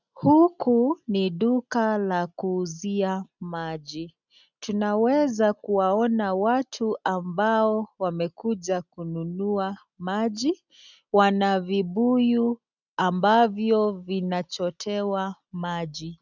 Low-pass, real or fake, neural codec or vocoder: 7.2 kHz; real; none